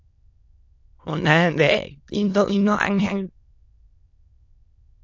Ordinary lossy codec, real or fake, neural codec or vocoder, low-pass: AAC, 48 kbps; fake; autoencoder, 22.05 kHz, a latent of 192 numbers a frame, VITS, trained on many speakers; 7.2 kHz